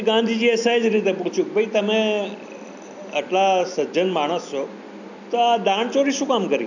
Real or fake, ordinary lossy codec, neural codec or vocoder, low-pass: real; none; none; 7.2 kHz